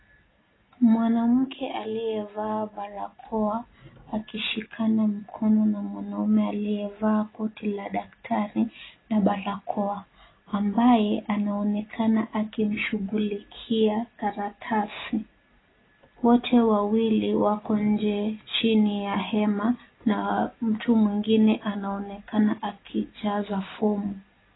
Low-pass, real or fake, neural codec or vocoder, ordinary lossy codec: 7.2 kHz; real; none; AAC, 16 kbps